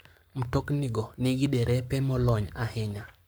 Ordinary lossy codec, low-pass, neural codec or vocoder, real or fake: none; none; codec, 44.1 kHz, 7.8 kbps, Pupu-Codec; fake